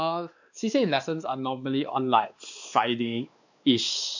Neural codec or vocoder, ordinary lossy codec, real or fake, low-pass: codec, 16 kHz, 2 kbps, X-Codec, WavLM features, trained on Multilingual LibriSpeech; none; fake; 7.2 kHz